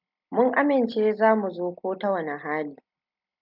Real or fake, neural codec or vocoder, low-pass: real; none; 5.4 kHz